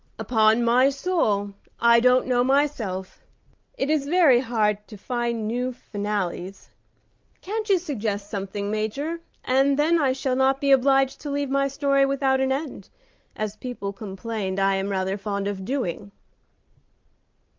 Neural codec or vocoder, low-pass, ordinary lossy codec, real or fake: none; 7.2 kHz; Opus, 24 kbps; real